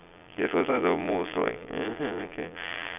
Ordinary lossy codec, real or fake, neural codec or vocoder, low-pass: none; fake; vocoder, 22.05 kHz, 80 mel bands, Vocos; 3.6 kHz